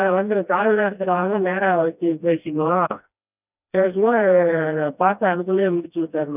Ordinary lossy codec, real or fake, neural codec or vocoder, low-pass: none; fake; codec, 16 kHz, 1 kbps, FreqCodec, smaller model; 3.6 kHz